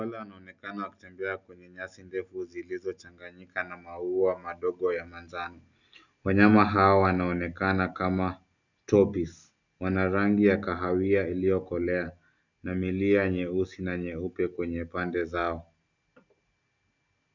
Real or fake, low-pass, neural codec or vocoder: real; 7.2 kHz; none